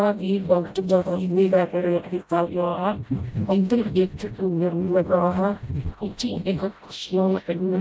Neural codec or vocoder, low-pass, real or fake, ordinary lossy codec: codec, 16 kHz, 0.5 kbps, FreqCodec, smaller model; none; fake; none